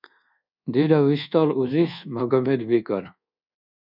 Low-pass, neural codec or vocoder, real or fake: 5.4 kHz; codec, 24 kHz, 1.2 kbps, DualCodec; fake